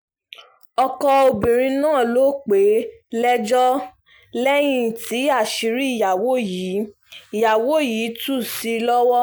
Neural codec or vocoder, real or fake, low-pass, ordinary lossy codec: none; real; none; none